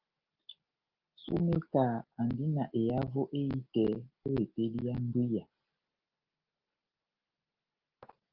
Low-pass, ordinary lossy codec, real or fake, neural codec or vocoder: 5.4 kHz; Opus, 24 kbps; real; none